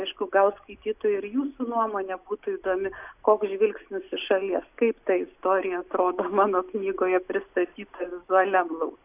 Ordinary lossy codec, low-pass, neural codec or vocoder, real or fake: AAC, 32 kbps; 3.6 kHz; none; real